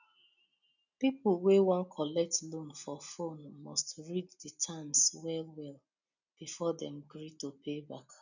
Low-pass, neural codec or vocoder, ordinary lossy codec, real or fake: 7.2 kHz; none; none; real